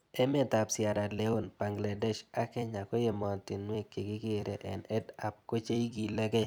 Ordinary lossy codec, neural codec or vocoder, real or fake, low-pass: none; vocoder, 44.1 kHz, 128 mel bands every 256 samples, BigVGAN v2; fake; none